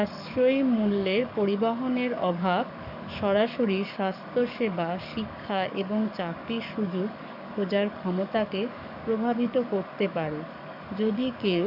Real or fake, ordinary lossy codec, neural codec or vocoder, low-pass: fake; none; codec, 44.1 kHz, 7.8 kbps, DAC; 5.4 kHz